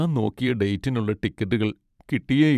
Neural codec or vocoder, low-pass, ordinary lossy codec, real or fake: vocoder, 44.1 kHz, 128 mel bands every 512 samples, BigVGAN v2; 14.4 kHz; none; fake